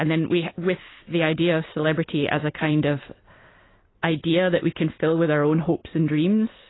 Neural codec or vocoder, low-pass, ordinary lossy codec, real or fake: none; 7.2 kHz; AAC, 16 kbps; real